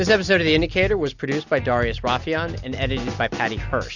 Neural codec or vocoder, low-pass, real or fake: none; 7.2 kHz; real